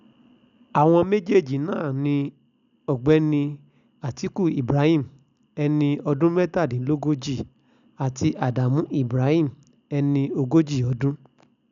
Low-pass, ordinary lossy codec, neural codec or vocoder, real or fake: 7.2 kHz; none; none; real